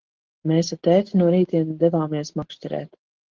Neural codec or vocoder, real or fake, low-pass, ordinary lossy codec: none; real; 7.2 kHz; Opus, 16 kbps